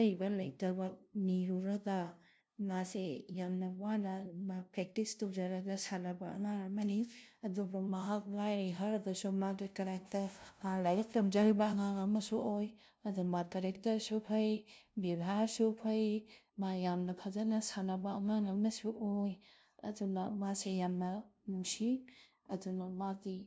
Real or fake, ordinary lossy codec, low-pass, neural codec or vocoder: fake; none; none; codec, 16 kHz, 0.5 kbps, FunCodec, trained on LibriTTS, 25 frames a second